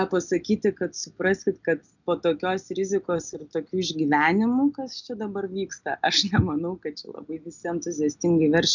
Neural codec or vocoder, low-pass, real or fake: none; 7.2 kHz; real